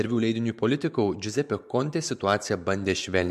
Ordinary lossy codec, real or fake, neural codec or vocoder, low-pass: MP3, 64 kbps; fake; vocoder, 44.1 kHz, 128 mel bands every 512 samples, BigVGAN v2; 14.4 kHz